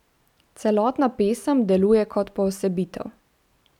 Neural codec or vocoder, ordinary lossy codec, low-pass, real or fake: none; none; 19.8 kHz; real